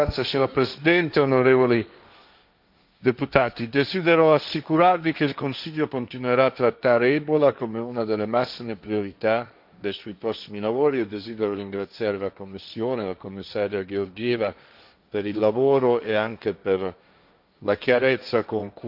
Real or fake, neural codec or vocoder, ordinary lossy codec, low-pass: fake; codec, 16 kHz, 1.1 kbps, Voila-Tokenizer; none; 5.4 kHz